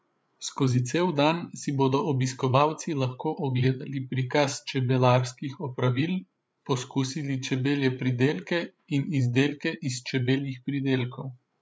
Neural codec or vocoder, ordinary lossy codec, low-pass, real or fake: codec, 16 kHz, 8 kbps, FreqCodec, larger model; none; none; fake